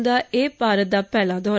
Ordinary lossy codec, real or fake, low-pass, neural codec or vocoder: none; real; none; none